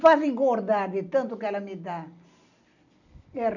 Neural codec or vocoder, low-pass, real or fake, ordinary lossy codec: none; 7.2 kHz; real; none